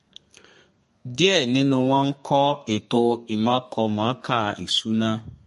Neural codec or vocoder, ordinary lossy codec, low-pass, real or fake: codec, 32 kHz, 1.9 kbps, SNAC; MP3, 48 kbps; 14.4 kHz; fake